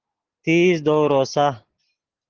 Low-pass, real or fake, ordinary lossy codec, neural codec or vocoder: 7.2 kHz; real; Opus, 16 kbps; none